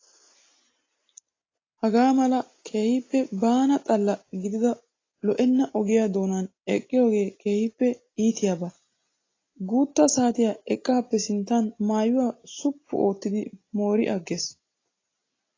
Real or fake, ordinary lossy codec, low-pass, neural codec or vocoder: real; AAC, 32 kbps; 7.2 kHz; none